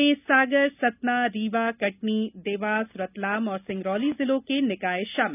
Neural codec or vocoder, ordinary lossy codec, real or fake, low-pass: none; none; real; 3.6 kHz